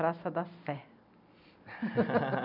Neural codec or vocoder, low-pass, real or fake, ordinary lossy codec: none; 5.4 kHz; real; none